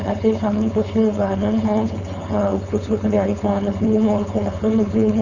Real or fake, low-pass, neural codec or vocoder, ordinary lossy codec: fake; 7.2 kHz; codec, 16 kHz, 4.8 kbps, FACodec; none